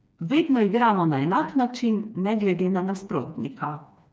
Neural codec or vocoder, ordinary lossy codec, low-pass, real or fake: codec, 16 kHz, 2 kbps, FreqCodec, smaller model; none; none; fake